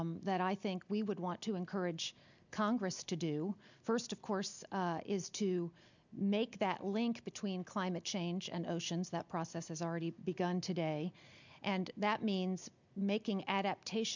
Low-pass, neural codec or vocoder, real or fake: 7.2 kHz; none; real